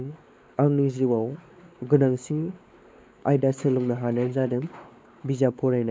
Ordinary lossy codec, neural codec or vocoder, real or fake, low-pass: none; codec, 16 kHz, 4 kbps, X-Codec, WavLM features, trained on Multilingual LibriSpeech; fake; none